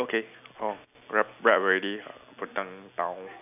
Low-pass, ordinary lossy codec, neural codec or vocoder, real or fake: 3.6 kHz; none; none; real